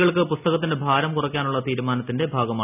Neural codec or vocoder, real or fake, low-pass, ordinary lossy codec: none; real; 3.6 kHz; none